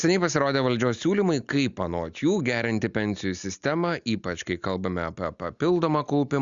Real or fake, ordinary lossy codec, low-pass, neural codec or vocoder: real; Opus, 64 kbps; 7.2 kHz; none